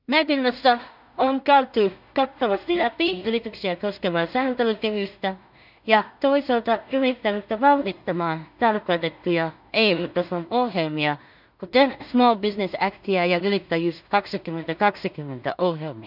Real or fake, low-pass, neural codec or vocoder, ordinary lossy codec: fake; 5.4 kHz; codec, 16 kHz in and 24 kHz out, 0.4 kbps, LongCat-Audio-Codec, two codebook decoder; none